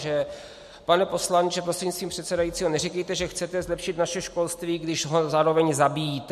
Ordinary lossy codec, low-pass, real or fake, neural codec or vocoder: MP3, 64 kbps; 14.4 kHz; real; none